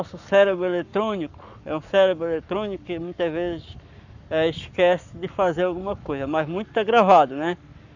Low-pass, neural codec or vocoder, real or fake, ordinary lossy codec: 7.2 kHz; codec, 44.1 kHz, 7.8 kbps, Pupu-Codec; fake; none